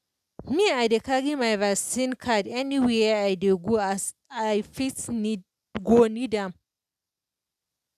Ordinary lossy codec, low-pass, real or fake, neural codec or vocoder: none; 14.4 kHz; real; none